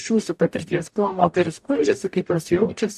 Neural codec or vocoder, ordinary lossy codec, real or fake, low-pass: codec, 44.1 kHz, 0.9 kbps, DAC; AAC, 96 kbps; fake; 14.4 kHz